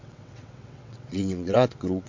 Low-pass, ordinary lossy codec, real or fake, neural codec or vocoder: 7.2 kHz; MP3, 48 kbps; fake; vocoder, 22.05 kHz, 80 mel bands, WaveNeXt